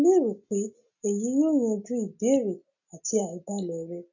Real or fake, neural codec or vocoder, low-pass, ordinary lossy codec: real; none; 7.2 kHz; none